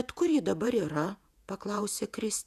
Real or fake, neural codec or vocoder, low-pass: fake; vocoder, 48 kHz, 128 mel bands, Vocos; 14.4 kHz